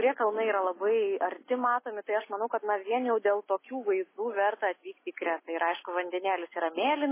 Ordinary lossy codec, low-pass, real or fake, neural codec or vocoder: MP3, 16 kbps; 3.6 kHz; real; none